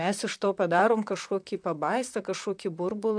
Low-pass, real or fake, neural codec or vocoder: 9.9 kHz; fake; vocoder, 44.1 kHz, 128 mel bands, Pupu-Vocoder